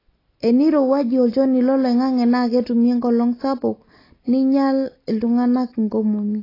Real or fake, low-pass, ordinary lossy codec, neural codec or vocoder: real; 5.4 kHz; AAC, 24 kbps; none